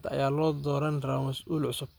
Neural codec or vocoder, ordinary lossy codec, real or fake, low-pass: none; none; real; none